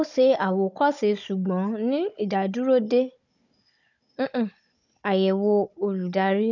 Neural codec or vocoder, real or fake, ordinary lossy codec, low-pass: codec, 16 kHz, 16 kbps, FunCodec, trained on Chinese and English, 50 frames a second; fake; none; 7.2 kHz